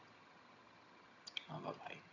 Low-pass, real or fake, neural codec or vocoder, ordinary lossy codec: 7.2 kHz; fake; vocoder, 22.05 kHz, 80 mel bands, HiFi-GAN; AAC, 48 kbps